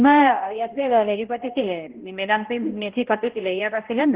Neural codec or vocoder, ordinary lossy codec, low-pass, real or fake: codec, 16 kHz, 0.5 kbps, X-Codec, HuBERT features, trained on balanced general audio; Opus, 16 kbps; 3.6 kHz; fake